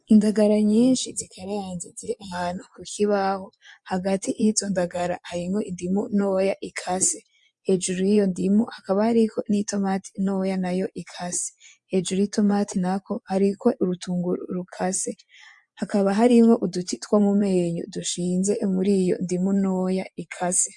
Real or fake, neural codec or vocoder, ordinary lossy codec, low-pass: fake; vocoder, 24 kHz, 100 mel bands, Vocos; MP3, 64 kbps; 10.8 kHz